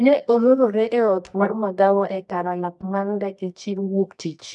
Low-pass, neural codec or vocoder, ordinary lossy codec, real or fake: none; codec, 24 kHz, 0.9 kbps, WavTokenizer, medium music audio release; none; fake